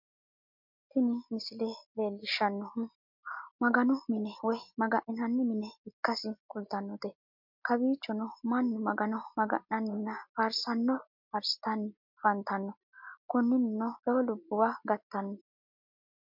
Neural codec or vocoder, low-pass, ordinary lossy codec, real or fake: none; 5.4 kHz; MP3, 48 kbps; real